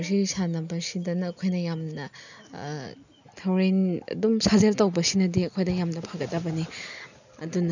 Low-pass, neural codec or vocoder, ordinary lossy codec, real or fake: 7.2 kHz; none; none; real